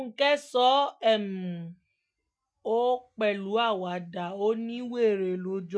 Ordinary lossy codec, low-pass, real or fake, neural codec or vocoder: none; none; real; none